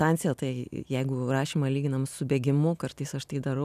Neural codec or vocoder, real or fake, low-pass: none; real; 14.4 kHz